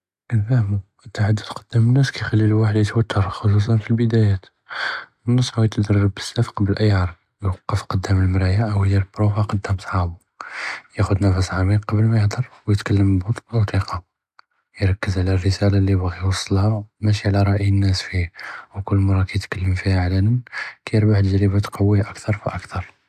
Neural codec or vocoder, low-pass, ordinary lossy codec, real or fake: none; 14.4 kHz; AAC, 96 kbps; real